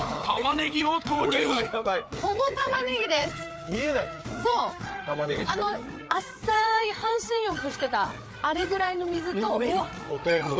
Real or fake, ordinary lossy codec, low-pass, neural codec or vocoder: fake; none; none; codec, 16 kHz, 4 kbps, FreqCodec, larger model